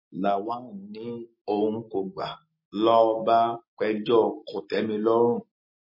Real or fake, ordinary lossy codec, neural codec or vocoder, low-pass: real; MP3, 24 kbps; none; 5.4 kHz